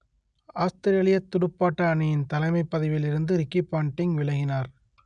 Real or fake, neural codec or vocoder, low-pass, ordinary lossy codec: real; none; 9.9 kHz; none